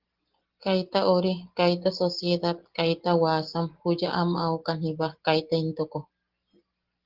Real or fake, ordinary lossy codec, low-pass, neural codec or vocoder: real; Opus, 16 kbps; 5.4 kHz; none